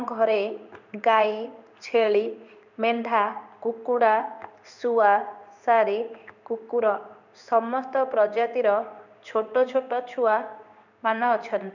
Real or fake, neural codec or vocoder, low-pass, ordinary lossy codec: fake; codec, 16 kHz in and 24 kHz out, 1 kbps, XY-Tokenizer; 7.2 kHz; none